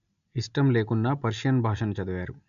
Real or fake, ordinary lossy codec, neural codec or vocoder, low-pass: real; none; none; 7.2 kHz